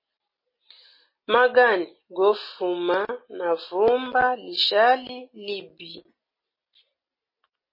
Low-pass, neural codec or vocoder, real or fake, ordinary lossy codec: 5.4 kHz; none; real; MP3, 24 kbps